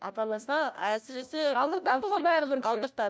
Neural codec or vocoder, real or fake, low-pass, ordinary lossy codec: codec, 16 kHz, 1 kbps, FunCodec, trained on LibriTTS, 50 frames a second; fake; none; none